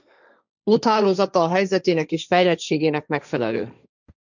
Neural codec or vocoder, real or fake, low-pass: codec, 16 kHz, 1.1 kbps, Voila-Tokenizer; fake; 7.2 kHz